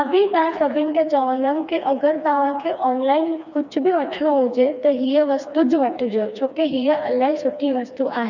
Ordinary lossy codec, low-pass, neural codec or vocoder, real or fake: none; 7.2 kHz; codec, 16 kHz, 2 kbps, FreqCodec, smaller model; fake